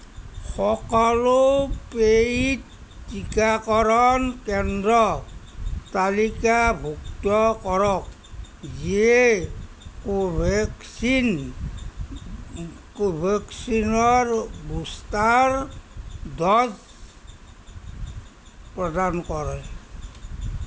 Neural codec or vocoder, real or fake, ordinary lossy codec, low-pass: none; real; none; none